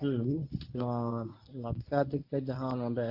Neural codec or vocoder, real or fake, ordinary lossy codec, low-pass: codec, 24 kHz, 0.9 kbps, WavTokenizer, medium speech release version 1; fake; AAC, 48 kbps; 5.4 kHz